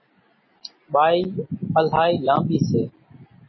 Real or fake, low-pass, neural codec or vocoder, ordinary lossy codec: real; 7.2 kHz; none; MP3, 24 kbps